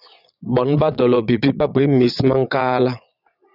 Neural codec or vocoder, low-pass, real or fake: vocoder, 22.05 kHz, 80 mel bands, Vocos; 5.4 kHz; fake